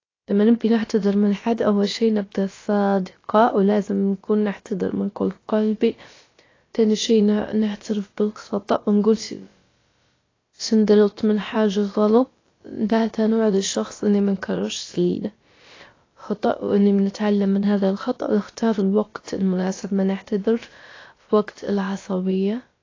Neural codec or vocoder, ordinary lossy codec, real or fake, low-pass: codec, 16 kHz, about 1 kbps, DyCAST, with the encoder's durations; AAC, 32 kbps; fake; 7.2 kHz